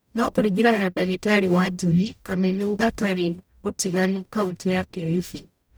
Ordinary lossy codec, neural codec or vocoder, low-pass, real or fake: none; codec, 44.1 kHz, 0.9 kbps, DAC; none; fake